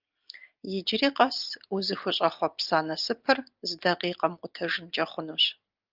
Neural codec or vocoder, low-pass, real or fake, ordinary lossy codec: none; 5.4 kHz; real; Opus, 32 kbps